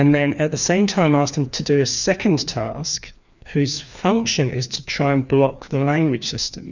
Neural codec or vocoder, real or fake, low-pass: codec, 16 kHz, 2 kbps, FreqCodec, larger model; fake; 7.2 kHz